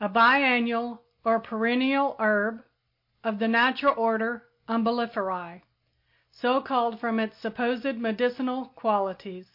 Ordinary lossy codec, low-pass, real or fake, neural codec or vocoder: MP3, 32 kbps; 5.4 kHz; real; none